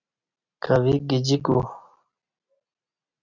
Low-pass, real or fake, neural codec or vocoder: 7.2 kHz; real; none